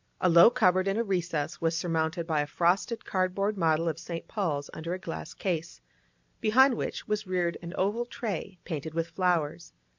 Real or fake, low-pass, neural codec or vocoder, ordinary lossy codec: real; 7.2 kHz; none; MP3, 64 kbps